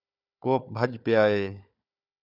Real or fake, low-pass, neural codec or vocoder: fake; 5.4 kHz; codec, 16 kHz, 4 kbps, FunCodec, trained on Chinese and English, 50 frames a second